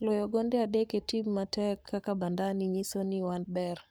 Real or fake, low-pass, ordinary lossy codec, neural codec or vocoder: fake; none; none; codec, 44.1 kHz, 7.8 kbps, Pupu-Codec